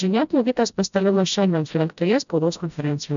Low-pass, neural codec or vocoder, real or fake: 7.2 kHz; codec, 16 kHz, 0.5 kbps, FreqCodec, smaller model; fake